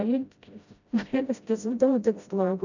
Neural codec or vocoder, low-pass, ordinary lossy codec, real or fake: codec, 16 kHz, 0.5 kbps, FreqCodec, smaller model; 7.2 kHz; none; fake